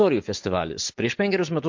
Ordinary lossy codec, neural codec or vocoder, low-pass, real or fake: MP3, 48 kbps; none; 7.2 kHz; real